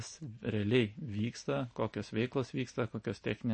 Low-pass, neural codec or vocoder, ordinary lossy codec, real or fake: 9.9 kHz; none; MP3, 32 kbps; real